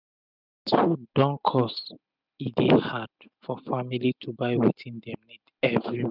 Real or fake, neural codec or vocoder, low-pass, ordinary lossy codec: real; none; 5.4 kHz; none